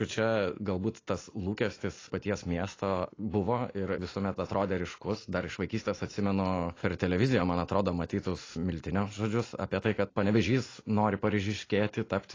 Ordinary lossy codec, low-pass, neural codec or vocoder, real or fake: AAC, 32 kbps; 7.2 kHz; none; real